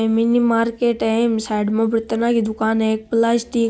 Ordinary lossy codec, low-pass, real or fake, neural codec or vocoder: none; none; real; none